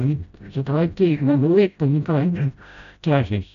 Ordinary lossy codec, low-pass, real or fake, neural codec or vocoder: none; 7.2 kHz; fake; codec, 16 kHz, 0.5 kbps, FreqCodec, smaller model